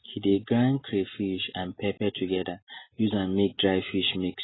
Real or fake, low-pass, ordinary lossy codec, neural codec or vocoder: real; 7.2 kHz; AAC, 16 kbps; none